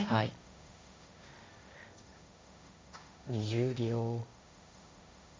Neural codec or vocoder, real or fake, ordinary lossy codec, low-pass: codec, 16 kHz, 1.1 kbps, Voila-Tokenizer; fake; none; none